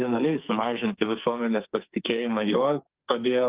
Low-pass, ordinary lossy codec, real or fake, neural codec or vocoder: 3.6 kHz; Opus, 32 kbps; fake; codec, 44.1 kHz, 2.6 kbps, SNAC